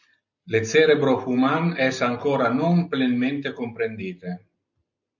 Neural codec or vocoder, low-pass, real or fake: none; 7.2 kHz; real